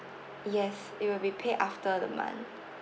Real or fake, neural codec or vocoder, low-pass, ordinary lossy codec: real; none; none; none